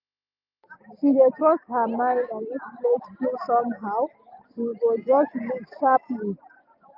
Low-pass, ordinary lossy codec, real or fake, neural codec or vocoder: 5.4 kHz; none; real; none